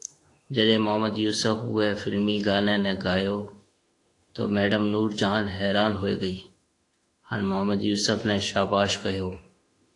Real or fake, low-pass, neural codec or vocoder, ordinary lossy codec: fake; 10.8 kHz; autoencoder, 48 kHz, 32 numbers a frame, DAC-VAE, trained on Japanese speech; AAC, 48 kbps